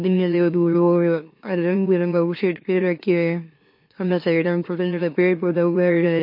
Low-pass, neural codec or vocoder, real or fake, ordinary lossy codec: 5.4 kHz; autoencoder, 44.1 kHz, a latent of 192 numbers a frame, MeloTTS; fake; MP3, 32 kbps